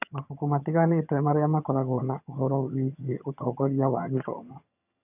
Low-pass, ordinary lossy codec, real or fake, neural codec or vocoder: 3.6 kHz; none; fake; vocoder, 22.05 kHz, 80 mel bands, HiFi-GAN